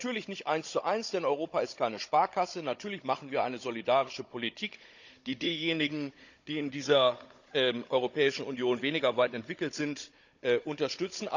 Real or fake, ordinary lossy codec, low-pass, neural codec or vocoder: fake; none; 7.2 kHz; codec, 16 kHz, 16 kbps, FunCodec, trained on Chinese and English, 50 frames a second